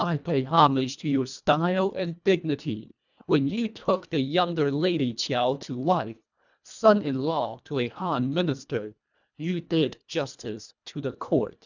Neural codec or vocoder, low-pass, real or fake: codec, 24 kHz, 1.5 kbps, HILCodec; 7.2 kHz; fake